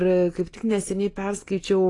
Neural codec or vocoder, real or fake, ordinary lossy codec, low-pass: none; real; AAC, 32 kbps; 10.8 kHz